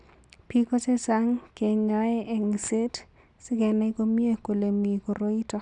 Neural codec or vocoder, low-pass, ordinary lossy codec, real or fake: none; 10.8 kHz; none; real